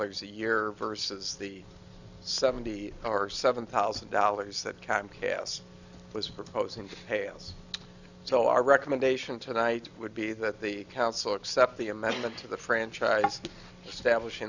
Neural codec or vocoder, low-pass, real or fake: none; 7.2 kHz; real